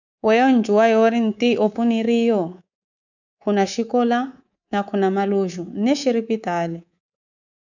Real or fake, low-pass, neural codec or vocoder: fake; 7.2 kHz; codec, 24 kHz, 3.1 kbps, DualCodec